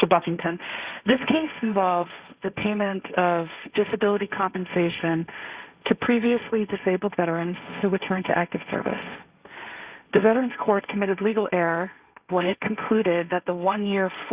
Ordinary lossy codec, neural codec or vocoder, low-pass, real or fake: Opus, 64 kbps; codec, 16 kHz, 1.1 kbps, Voila-Tokenizer; 3.6 kHz; fake